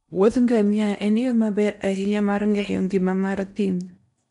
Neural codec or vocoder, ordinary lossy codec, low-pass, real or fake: codec, 16 kHz in and 24 kHz out, 0.8 kbps, FocalCodec, streaming, 65536 codes; none; 10.8 kHz; fake